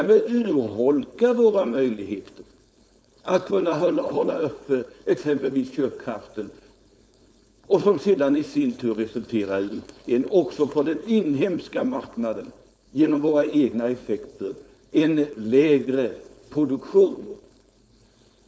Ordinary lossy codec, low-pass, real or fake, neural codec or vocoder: none; none; fake; codec, 16 kHz, 4.8 kbps, FACodec